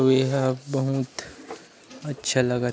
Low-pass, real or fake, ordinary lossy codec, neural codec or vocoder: none; real; none; none